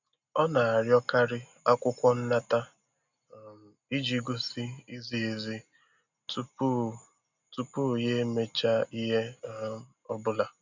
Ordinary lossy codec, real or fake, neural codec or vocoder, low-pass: none; real; none; 7.2 kHz